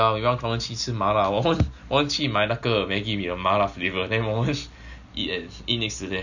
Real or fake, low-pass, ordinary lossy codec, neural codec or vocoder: real; 7.2 kHz; none; none